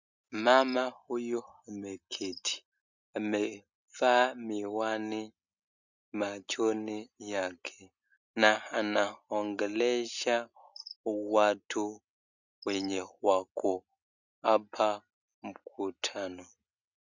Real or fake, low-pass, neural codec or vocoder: real; 7.2 kHz; none